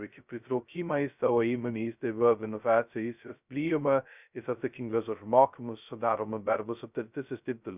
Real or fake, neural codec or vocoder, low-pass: fake; codec, 16 kHz, 0.2 kbps, FocalCodec; 3.6 kHz